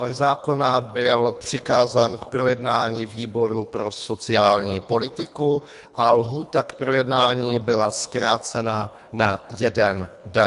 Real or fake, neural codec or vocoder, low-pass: fake; codec, 24 kHz, 1.5 kbps, HILCodec; 10.8 kHz